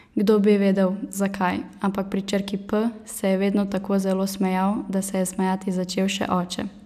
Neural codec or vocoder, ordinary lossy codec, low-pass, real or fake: none; none; 14.4 kHz; real